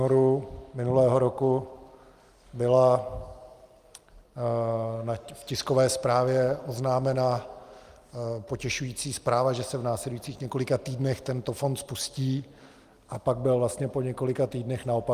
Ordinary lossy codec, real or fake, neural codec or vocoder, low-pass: Opus, 32 kbps; real; none; 14.4 kHz